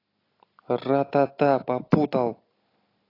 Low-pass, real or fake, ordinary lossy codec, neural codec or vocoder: 5.4 kHz; real; AAC, 24 kbps; none